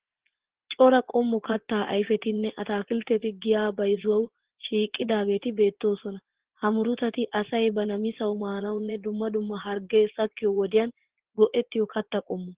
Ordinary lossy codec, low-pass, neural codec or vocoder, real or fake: Opus, 16 kbps; 3.6 kHz; vocoder, 24 kHz, 100 mel bands, Vocos; fake